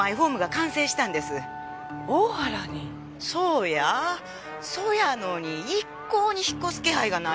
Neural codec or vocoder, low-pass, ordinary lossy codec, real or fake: none; none; none; real